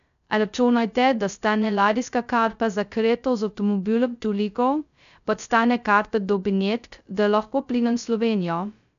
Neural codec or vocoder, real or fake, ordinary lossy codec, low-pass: codec, 16 kHz, 0.2 kbps, FocalCodec; fake; none; 7.2 kHz